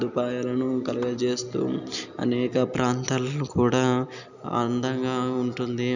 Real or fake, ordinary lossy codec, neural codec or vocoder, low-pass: real; none; none; 7.2 kHz